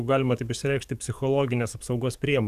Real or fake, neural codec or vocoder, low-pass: fake; codec, 44.1 kHz, 7.8 kbps, DAC; 14.4 kHz